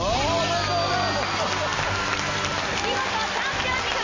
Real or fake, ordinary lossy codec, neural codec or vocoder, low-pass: real; MP3, 32 kbps; none; 7.2 kHz